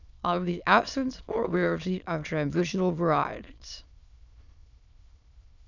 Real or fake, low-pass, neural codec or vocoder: fake; 7.2 kHz; autoencoder, 22.05 kHz, a latent of 192 numbers a frame, VITS, trained on many speakers